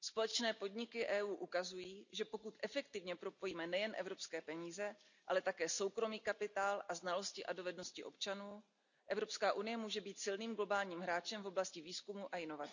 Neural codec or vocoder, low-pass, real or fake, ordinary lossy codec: none; 7.2 kHz; real; none